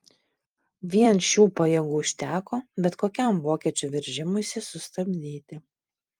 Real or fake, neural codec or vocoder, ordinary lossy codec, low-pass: fake; vocoder, 44.1 kHz, 128 mel bands every 256 samples, BigVGAN v2; Opus, 32 kbps; 19.8 kHz